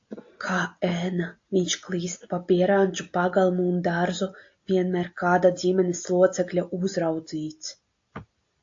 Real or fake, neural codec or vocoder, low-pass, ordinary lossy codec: real; none; 7.2 kHz; AAC, 48 kbps